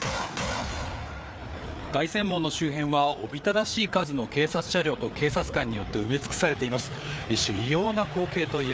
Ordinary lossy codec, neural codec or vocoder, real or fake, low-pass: none; codec, 16 kHz, 4 kbps, FreqCodec, larger model; fake; none